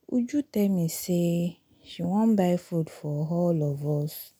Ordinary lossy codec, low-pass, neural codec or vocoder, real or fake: none; none; none; real